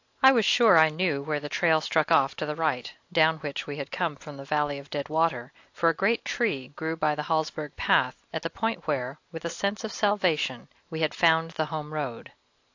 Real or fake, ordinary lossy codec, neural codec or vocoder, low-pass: real; AAC, 48 kbps; none; 7.2 kHz